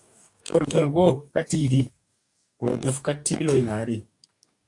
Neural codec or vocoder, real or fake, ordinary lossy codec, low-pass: codec, 44.1 kHz, 2.6 kbps, DAC; fake; AAC, 64 kbps; 10.8 kHz